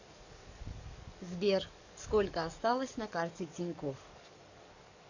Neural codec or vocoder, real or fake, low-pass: codec, 44.1 kHz, 7.8 kbps, DAC; fake; 7.2 kHz